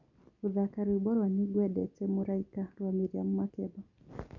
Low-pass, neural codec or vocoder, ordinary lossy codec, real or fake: 7.2 kHz; none; none; real